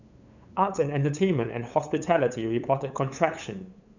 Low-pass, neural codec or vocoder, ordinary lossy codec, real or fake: 7.2 kHz; codec, 16 kHz, 8 kbps, FunCodec, trained on LibriTTS, 25 frames a second; none; fake